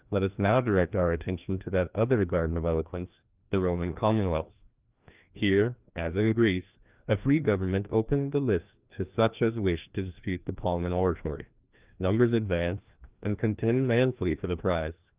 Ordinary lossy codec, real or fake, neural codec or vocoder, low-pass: Opus, 32 kbps; fake; codec, 16 kHz, 1 kbps, FreqCodec, larger model; 3.6 kHz